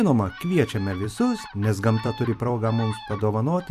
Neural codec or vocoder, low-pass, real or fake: vocoder, 44.1 kHz, 128 mel bands every 256 samples, BigVGAN v2; 14.4 kHz; fake